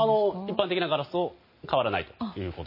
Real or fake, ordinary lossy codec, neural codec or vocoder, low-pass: real; MP3, 24 kbps; none; 5.4 kHz